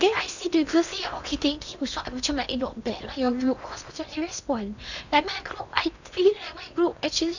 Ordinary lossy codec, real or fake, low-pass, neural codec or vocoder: none; fake; 7.2 kHz; codec, 16 kHz in and 24 kHz out, 0.8 kbps, FocalCodec, streaming, 65536 codes